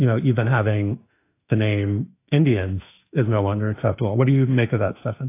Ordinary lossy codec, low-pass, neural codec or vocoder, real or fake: AAC, 24 kbps; 3.6 kHz; autoencoder, 48 kHz, 32 numbers a frame, DAC-VAE, trained on Japanese speech; fake